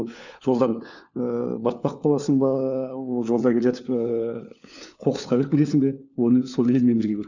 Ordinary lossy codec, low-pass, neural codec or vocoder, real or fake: none; 7.2 kHz; codec, 16 kHz, 4 kbps, FunCodec, trained on LibriTTS, 50 frames a second; fake